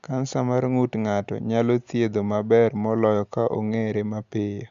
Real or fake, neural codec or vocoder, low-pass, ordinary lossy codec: real; none; 7.2 kHz; Opus, 64 kbps